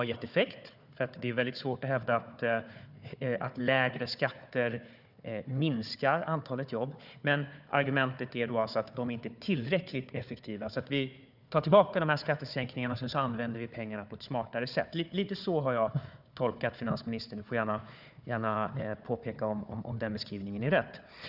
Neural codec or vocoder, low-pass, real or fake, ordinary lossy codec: codec, 16 kHz, 4 kbps, FunCodec, trained on Chinese and English, 50 frames a second; 5.4 kHz; fake; none